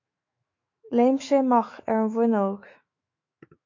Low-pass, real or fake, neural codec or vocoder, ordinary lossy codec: 7.2 kHz; fake; autoencoder, 48 kHz, 128 numbers a frame, DAC-VAE, trained on Japanese speech; MP3, 48 kbps